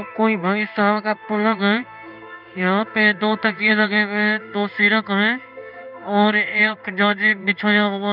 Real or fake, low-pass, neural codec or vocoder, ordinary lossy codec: fake; 5.4 kHz; codec, 16 kHz in and 24 kHz out, 1 kbps, XY-Tokenizer; none